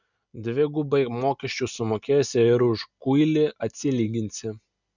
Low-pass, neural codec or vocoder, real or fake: 7.2 kHz; none; real